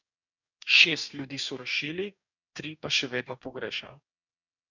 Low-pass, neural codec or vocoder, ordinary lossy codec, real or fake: 7.2 kHz; codec, 44.1 kHz, 2.6 kbps, DAC; none; fake